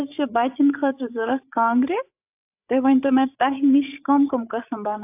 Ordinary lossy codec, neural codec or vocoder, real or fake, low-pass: AAC, 32 kbps; codec, 16 kHz, 8 kbps, FreqCodec, larger model; fake; 3.6 kHz